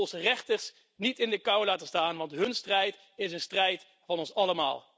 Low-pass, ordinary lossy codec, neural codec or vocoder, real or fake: none; none; none; real